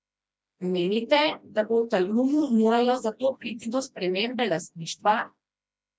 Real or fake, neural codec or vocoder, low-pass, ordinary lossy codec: fake; codec, 16 kHz, 1 kbps, FreqCodec, smaller model; none; none